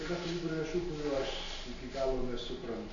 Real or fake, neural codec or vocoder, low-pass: real; none; 7.2 kHz